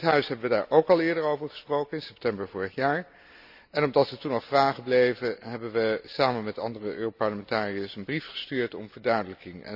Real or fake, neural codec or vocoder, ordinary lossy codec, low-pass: real; none; none; 5.4 kHz